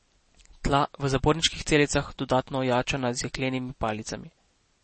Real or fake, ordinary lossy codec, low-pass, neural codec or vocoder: real; MP3, 32 kbps; 10.8 kHz; none